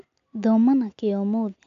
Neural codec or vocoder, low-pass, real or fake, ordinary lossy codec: none; 7.2 kHz; real; none